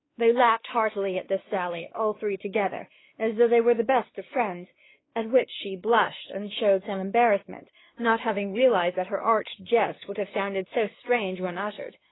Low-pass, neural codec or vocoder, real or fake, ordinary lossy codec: 7.2 kHz; codec, 16 kHz, 2 kbps, X-Codec, WavLM features, trained on Multilingual LibriSpeech; fake; AAC, 16 kbps